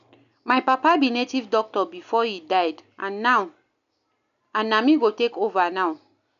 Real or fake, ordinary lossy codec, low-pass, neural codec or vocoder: real; none; 7.2 kHz; none